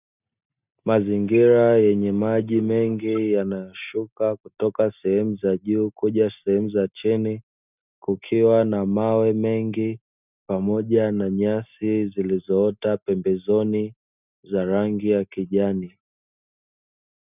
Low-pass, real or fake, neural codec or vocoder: 3.6 kHz; real; none